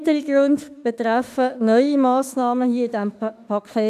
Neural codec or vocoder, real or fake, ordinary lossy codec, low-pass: autoencoder, 48 kHz, 32 numbers a frame, DAC-VAE, trained on Japanese speech; fake; none; 14.4 kHz